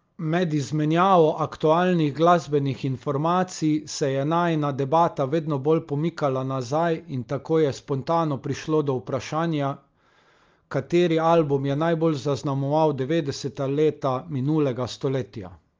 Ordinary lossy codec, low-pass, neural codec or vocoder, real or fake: Opus, 24 kbps; 7.2 kHz; none; real